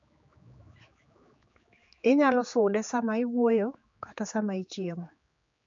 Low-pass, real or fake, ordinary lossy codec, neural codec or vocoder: 7.2 kHz; fake; MP3, 48 kbps; codec, 16 kHz, 4 kbps, X-Codec, HuBERT features, trained on general audio